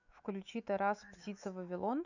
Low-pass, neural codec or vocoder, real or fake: 7.2 kHz; autoencoder, 48 kHz, 128 numbers a frame, DAC-VAE, trained on Japanese speech; fake